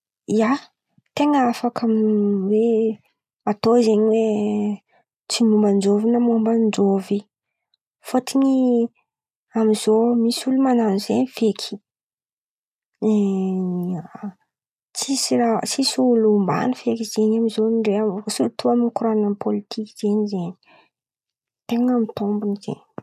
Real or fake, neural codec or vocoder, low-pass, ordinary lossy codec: real; none; 14.4 kHz; none